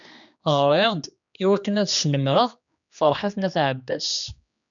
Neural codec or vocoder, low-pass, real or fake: codec, 16 kHz, 2 kbps, X-Codec, HuBERT features, trained on general audio; 7.2 kHz; fake